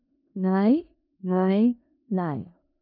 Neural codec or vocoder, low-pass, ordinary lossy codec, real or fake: codec, 16 kHz in and 24 kHz out, 0.4 kbps, LongCat-Audio-Codec, four codebook decoder; 5.4 kHz; none; fake